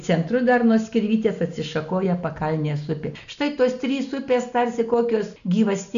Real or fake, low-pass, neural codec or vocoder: real; 7.2 kHz; none